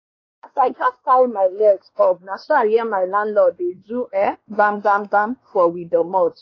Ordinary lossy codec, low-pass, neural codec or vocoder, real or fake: AAC, 32 kbps; 7.2 kHz; codec, 16 kHz, 2 kbps, X-Codec, WavLM features, trained on Multilingual LibriSpeech; fake